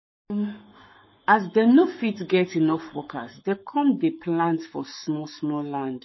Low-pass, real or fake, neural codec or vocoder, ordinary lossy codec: 7.2 kHz; fake; codec, 44.1 kHz, 7.8 kbps, Pupu-Codec; MP3, 24 kbps